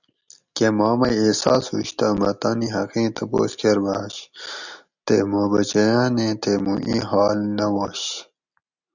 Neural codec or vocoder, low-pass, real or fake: none; 7.2 kHz; real